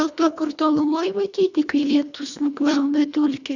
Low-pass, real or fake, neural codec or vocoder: 7.2 kHz; fake; codec, 24 kHz, 1.5 kbps, HILCodec